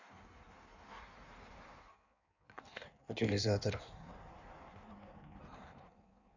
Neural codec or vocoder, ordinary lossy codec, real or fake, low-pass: codec, 16 kHz in and 24 kHz out, 1.1 kbps, FireRedTTS-2 codec; none; fake; 7.2 kHz